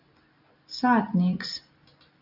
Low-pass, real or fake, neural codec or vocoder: 5.4 kHz; real; none